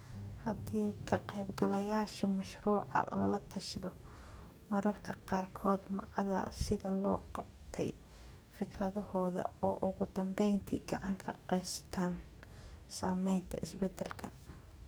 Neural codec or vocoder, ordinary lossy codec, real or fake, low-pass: codec, 44.1 kHz, 2.6 kbps, DAC; none; fake; none